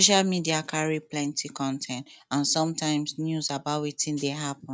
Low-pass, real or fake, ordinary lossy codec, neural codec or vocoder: none; real; none; none